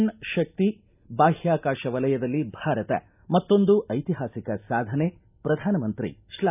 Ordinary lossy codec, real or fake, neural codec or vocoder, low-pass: none; real; none; 3.6 kHz